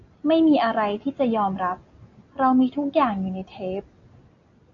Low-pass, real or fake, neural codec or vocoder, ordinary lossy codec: 7.2 kHz; real; none; AAC, 48 kbps